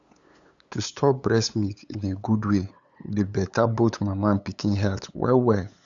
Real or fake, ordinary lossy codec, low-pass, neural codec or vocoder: fake; Opus, 64 kbps; 7.2 kHz; codec, 16 kHz, 8 kbps, FunCodec, trained on LibriTTS, 25 frames a second